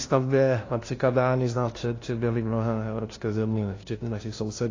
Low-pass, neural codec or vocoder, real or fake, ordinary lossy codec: 7.2 kHz; codec, 16 kHz, 0.5 kbps, FunCodec, trained on LibriTTS, 25 frames a second; fake; AAC, 32 kbps